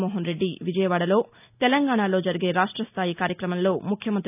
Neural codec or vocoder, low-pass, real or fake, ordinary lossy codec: none; 3.6 kHz; real; none